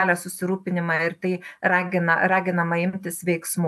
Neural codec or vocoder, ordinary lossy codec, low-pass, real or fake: none; AAC, 96 kbps; 14.4 kHz; real